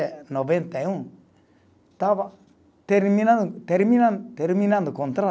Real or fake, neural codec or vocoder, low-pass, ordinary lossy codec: real; none; none; none